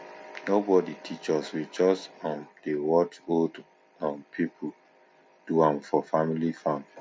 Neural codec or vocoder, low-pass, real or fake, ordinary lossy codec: none; none; real; none